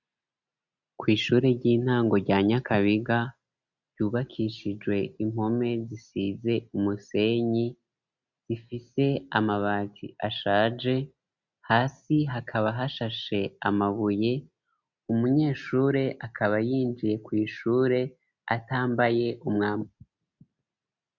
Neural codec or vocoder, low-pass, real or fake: none; 7.2 kHz; real